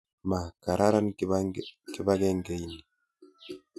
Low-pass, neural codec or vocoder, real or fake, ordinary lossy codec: none; none; real; none